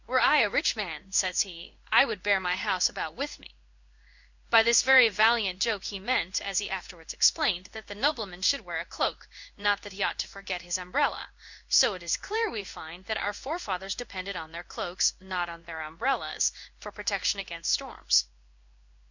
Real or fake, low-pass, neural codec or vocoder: fake; 7.2 kHz; codec, 16 kHz in and 24 kHz out, 1 kbps, XY-Tokenizer